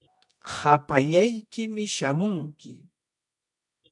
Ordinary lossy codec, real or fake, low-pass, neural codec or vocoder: MP3, 64 kbps; fake; 10.8 kHz; codec, 24 kHz, 0.9 kbps, WavTokenizer, medium music audio release